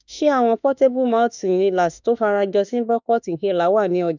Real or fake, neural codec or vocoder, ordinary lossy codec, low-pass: fake; autoencoder, 48 kHz, 32 numbers a frame, DAC-VAE, trained on Japanese speech; none; 7.2 kHz